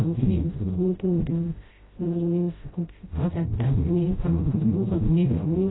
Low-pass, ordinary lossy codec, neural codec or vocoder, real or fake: 7.2 kHz; AAC, 16 kbps; codec, 16 kHz, 0.5 kbps, FreqCodec, smaller model; fake